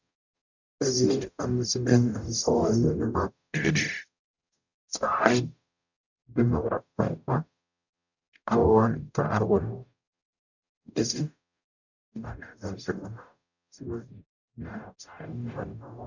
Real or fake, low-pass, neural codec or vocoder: fake; 7.2 kHz; codec, 44.1 kHz, 0.9 kbps, DAC